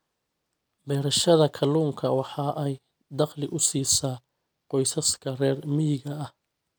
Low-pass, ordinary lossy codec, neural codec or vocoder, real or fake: none; none; none; real